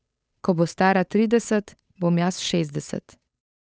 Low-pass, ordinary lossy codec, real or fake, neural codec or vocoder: none; none; fake; codec, 16 kHz, 8 kbps, FunCodec, trained on Chinese and English, 25 frames a second